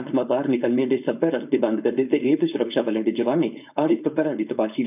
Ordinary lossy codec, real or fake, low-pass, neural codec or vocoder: none; fake; 3.6 kHz; codec, 16 kHz, 4.8 kbps, FACodec